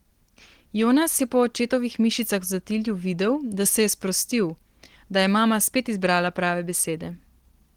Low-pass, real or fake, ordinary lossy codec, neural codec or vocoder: 19.8 kHz; real; Opus, 16 kbps; none